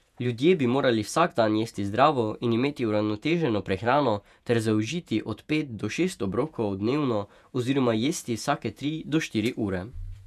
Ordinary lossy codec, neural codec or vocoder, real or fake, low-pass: none; vocoder, 48 kHz, 128 mel bands, Vocos; fake; 14.4 kHz